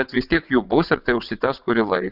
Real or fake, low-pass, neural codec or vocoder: fake; 5.4 kHz; vocoder, 22.05 kHz, 80 mel bands, WaveNeXt